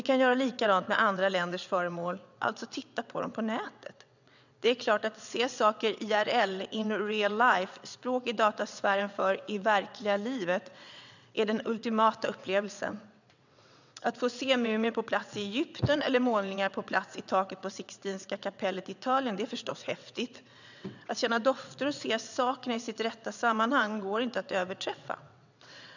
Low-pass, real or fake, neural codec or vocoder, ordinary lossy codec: 7.2 kHz; fake; vocoder, 22.05 kHz, 80 mel bands, WaveNeXt; none